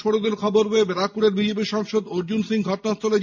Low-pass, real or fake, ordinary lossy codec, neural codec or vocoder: 7.2 kHz; real; MP3, 48 kbps; none